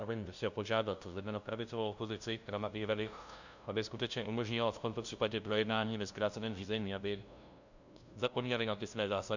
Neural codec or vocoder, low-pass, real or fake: codec, 16 kHz, 0.5 kbps, FunCodec, trained on LibriTTS, 25 frames a second; 7.2 kHz; fake